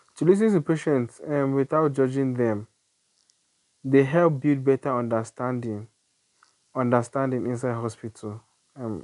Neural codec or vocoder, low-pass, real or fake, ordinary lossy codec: none; 10.8 kHz; real; none